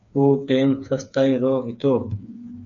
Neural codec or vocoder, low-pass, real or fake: codec, 16 kHz, 4 kbps, FreqCodec, smaller model; 7.2 kHz; fake